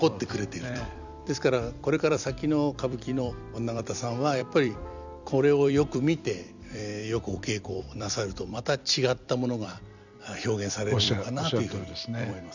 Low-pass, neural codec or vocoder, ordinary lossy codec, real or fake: 7.2 kHz; vocoder, 44.1 kHz, 128 mel bands every 256 samples, BigVGAN v2; none; fake